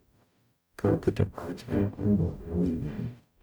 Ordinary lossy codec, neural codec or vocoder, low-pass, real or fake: none; codec, 44.1 kHz, 0.9 kbps, DAC; none; fake